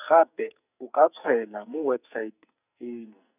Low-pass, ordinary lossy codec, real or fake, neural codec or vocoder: 3.6 kHz; none; fake; codec, 44.1 kHz, 2.6 kbps, SNAC